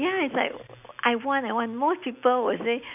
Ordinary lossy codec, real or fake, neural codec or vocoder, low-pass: none; real; none; 3.6 kHz